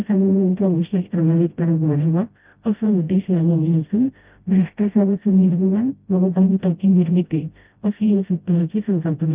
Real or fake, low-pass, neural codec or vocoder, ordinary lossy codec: fake; 3.6 kHz; codec, 16 kHz, 0.5 kbps, FreqCodec, smaller model; Opus, 24 kbps